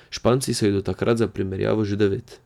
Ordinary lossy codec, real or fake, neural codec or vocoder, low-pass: none; real; none; 19.8 kHz